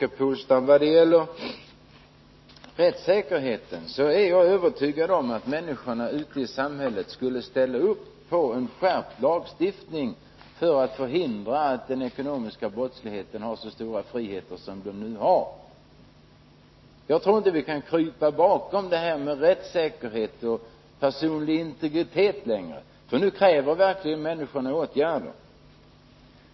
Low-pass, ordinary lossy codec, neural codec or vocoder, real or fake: 7.2 kHz; MP3, 24 kbps; none; real